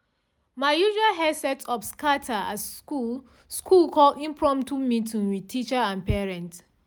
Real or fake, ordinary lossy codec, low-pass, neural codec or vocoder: real; none; none; none